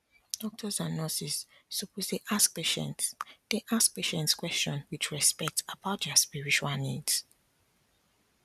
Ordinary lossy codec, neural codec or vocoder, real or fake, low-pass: none; none; real; 14.4 kHz